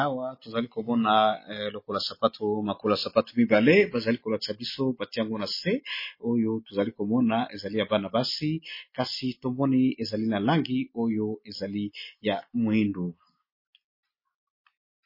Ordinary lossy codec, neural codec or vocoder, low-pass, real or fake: MP3, 24 kbps; none; 5.4 kHz; real